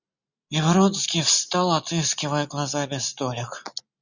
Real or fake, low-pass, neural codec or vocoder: real; 7.2 kHz; none